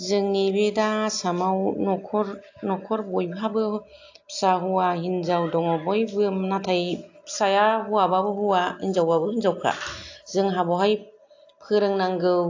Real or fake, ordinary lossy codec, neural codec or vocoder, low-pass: real; AAC, 48 kbps; none; 7.2 kHz